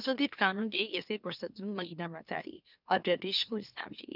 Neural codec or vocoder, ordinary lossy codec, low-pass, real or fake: autoencoder, 44.1 kHz, a latent of 192 numbers a frame, MeloTTS; none; 5.4 kHz; fake